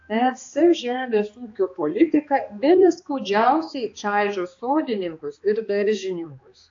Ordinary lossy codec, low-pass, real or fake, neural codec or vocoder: AAC, 48 kbps; 7.2 kHz; fake; codec, 16 kHz, 2 kbps, X-Codec, HuBERT features, trained on balanced general audio